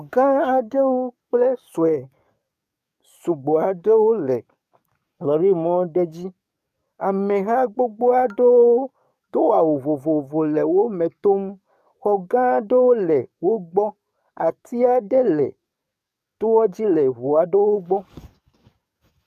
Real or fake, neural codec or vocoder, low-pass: fake; codec, 44.1 kHz, 7.8 kbps, DAC; 14.4 kHz